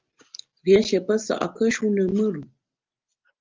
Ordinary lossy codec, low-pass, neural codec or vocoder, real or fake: Opus, 24 kbps; 7.2 kHz; none; real